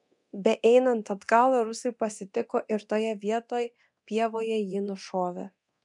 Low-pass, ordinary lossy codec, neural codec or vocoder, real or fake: 10.8 kHz; MP3, 96 kbps; codec, 24 kHz, 0.9 kbps, DualCodec; fake